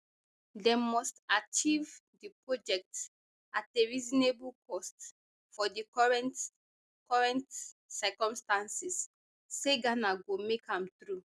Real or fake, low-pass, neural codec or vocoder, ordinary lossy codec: real; none; none; none